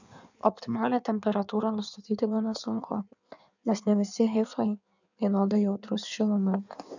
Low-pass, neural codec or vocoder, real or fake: 7.2 kHz; codec, 16 kHz in and 24 kHz out, 1.1 kbps, FireRedTTS-2 codec; fake